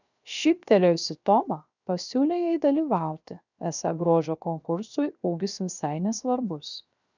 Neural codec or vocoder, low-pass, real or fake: codec, 16 kHz, 0.7 kbps, FocalCodec; 7.2 kHz; fake